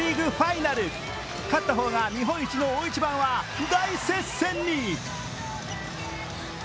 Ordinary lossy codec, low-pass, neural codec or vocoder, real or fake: none; none; none; real